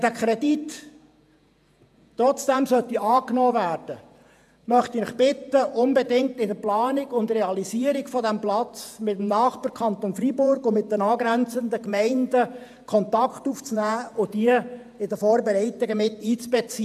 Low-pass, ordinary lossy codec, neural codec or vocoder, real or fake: 14.4 kHz; AAC, 96 kbps; vocoder, 48 kHz, 128 mel bands, Vocos; fake